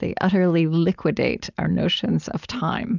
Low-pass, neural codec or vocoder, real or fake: 7.2 kHz; none; real